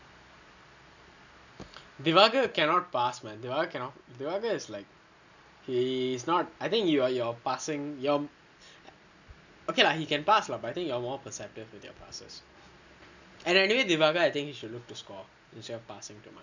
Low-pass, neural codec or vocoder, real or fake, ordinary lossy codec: 7.2 kHz; none; real; none